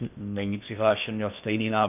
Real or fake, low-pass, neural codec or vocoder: fake; 3.6 kHz; codec, 16 kHz in and 24 kHz out, 0.8 kbps, FocalCodec, streaming, 65536 codes